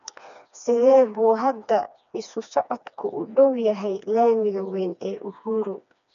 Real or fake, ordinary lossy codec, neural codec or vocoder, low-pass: fake; none; codec, 16 kHz, 2 kbps, FreqCodec, smaller model; 7.2 kHz